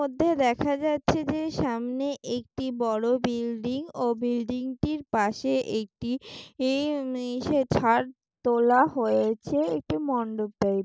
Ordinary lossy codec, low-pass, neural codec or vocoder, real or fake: none; none; none; real